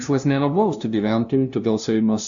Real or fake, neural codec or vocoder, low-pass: fake; codec, 16 kHz, 0.5 kbps, FunCodec, trained on LibriTTS, 25 frames a second; 7.2 kHz